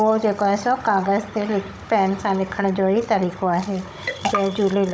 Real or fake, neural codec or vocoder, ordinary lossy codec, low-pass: fake; codec, 16 kHz, 16 kbps, FunCodec, trained on Chinese and English, 50 frames a second; none; none